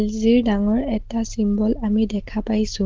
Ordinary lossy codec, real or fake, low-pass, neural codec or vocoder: Opus, 16 kbps; real; 7.2 kHz; none